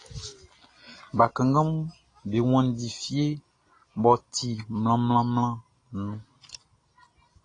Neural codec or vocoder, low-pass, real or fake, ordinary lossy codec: none; 9.9 kHz; real; AAC, 32 kbps